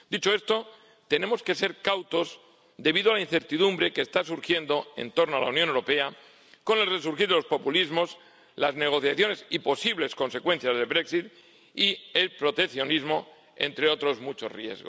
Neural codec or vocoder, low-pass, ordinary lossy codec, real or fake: none; none; none; real